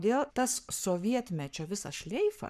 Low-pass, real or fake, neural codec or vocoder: 14.4 kHz; fake; codec, 44.1 kHz, 7.8 kbps, Pupu-Codec